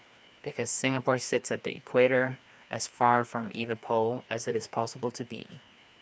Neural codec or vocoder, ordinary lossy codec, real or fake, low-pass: codec, 16 kHz, 2 kbps, FreqCodec, larger model; none; fake; none